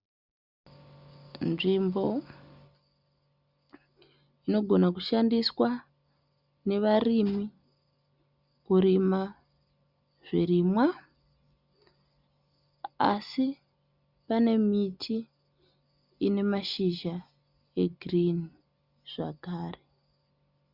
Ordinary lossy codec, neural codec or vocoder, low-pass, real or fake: Opus, 64 kbps; none; 5.4 kHz; real